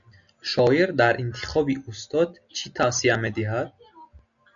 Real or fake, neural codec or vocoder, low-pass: real; none; 7.2 kHz